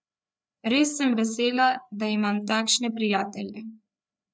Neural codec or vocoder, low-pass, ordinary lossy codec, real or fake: codec, 16 kHz, 4 kbps, FreqCodec, larger model; none; none; fake